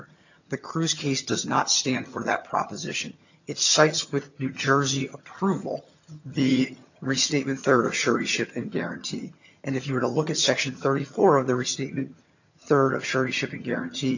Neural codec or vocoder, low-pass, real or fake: vocoder, 22.05 kHz, 80 mel bands, HiFi-GAN; 7.2 kHz; fake